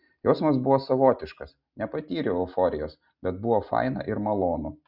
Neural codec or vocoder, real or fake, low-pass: none; real; 5.4 kHz